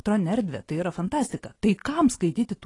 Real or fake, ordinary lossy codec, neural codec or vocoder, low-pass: real; AAC, 32 kbps; none; 10.8 kHz